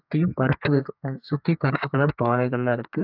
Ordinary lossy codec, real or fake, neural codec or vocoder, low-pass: AAC, 48 kbps; fake; codec, 32 kHz, 1.9 kbps, SNAC; 5.4 kHz